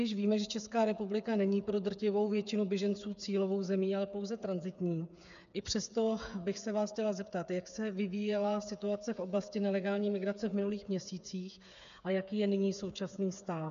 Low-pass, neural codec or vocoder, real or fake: 7.2 kHz; codec, 16 kHz, 8 kbps, FreqCodec, smaller model; fake